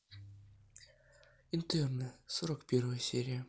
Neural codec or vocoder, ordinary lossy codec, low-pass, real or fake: none; none; none; real